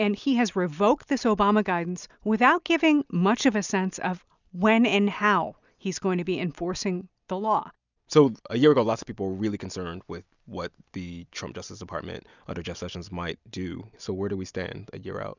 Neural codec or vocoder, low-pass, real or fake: none; 7.2 kHz; real